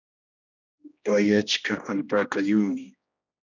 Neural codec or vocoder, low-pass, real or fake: codec, 16 kHz, 1 kbps, X-Codec, HuBERT features, trained on general audio; 7.2 kHz; fake